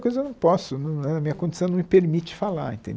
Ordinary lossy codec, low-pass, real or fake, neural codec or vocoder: none; none; real; none